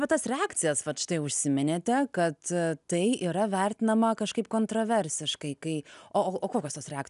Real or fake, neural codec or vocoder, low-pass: real; none; 10.8 kHz